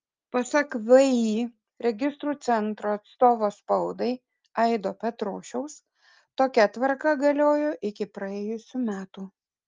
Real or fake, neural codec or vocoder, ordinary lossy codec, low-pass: real; none; Opus, 24 kbps; 7.2 kHz